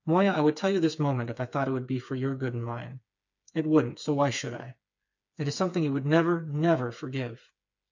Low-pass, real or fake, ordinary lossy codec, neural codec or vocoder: 7.2 kHz; fake; MP3, 64 kbps; codec, 16 kHz, 4 kbps, FreqCodec, smaller model